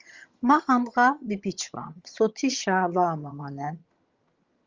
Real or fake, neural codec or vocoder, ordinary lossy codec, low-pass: fake; vocoder, 22.05 kHz, 80 mel bands, HiFi-GAN; Opus, 32 kbps; 7.2 kHz